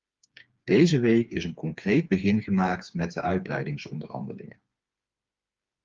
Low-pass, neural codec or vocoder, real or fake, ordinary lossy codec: 7.2 kHz; codec, 16 kHz, 4 kbps, FreqCodec, smaller model; fake; Opus, 32 kbps